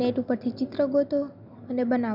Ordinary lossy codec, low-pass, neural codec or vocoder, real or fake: none; 5.4 kHz; none; real